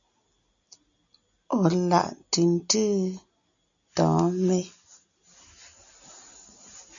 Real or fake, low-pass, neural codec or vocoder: real; 7.2 kHz; none